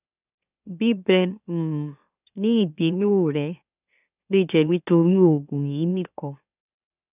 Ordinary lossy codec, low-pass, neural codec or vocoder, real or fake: none; 3.6 kHz; autoencoder, 44.1 kHz, a latent of 192 numbers a frame, MeloTTS; fake